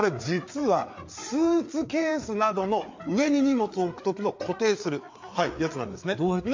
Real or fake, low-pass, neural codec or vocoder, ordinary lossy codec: fake; 7.2 kHz; codec, 16 kHz, 4 kbps, FreqCodec, larger model; MP3, 48 kbps